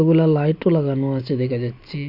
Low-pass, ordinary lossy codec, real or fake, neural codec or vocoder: 5.4 kHz; MP3, 48 kbps; real; none